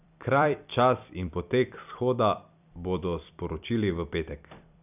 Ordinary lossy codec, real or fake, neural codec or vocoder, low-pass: none; fake; vocoder, 44.1 kHz, 128 mel bands every 256 samples, BigVGAN v2; 3.6 kHz